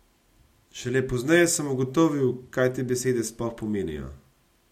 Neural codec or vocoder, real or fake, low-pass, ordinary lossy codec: none; real; 19.8 kHz; MP3, 64 kbps